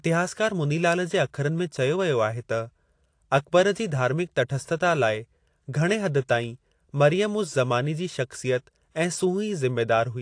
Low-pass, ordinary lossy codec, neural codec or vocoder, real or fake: 9.9 kHz; AAC, 48 kbps; none; real